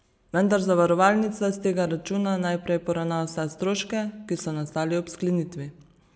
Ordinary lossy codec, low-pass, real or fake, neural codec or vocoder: none; none; real; none